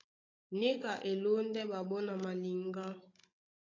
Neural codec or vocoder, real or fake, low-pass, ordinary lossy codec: codec, 16 kHz, 16 kbps, FunCodec, trained on Chinese and English, 50 frames a second; fake; 7.2 kHz; AAC, 32 kbps